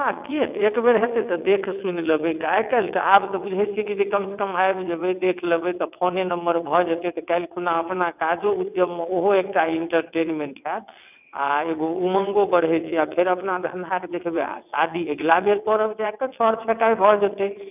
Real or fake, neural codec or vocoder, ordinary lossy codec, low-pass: fake; vocoder, 22.05 kHz, 80 mel bands, WaveNeXt; none; 3.6 kHz